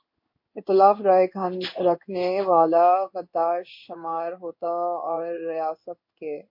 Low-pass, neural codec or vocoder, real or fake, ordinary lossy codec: 5.4 kHz; codec, 16 kHz in and 24 kHz out, 1 kbps, XY-Tokenizer; fake; MP3, 32 kbps